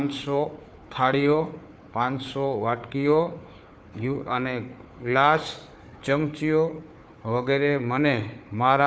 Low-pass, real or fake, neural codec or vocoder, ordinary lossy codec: none; fake; codec, 16 kHz, 4 kbps, FunCodec, trained on Chinese and English, 50 frames a second; none